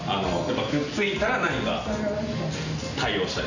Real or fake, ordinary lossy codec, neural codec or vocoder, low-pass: real; none; none; 7.2 kHz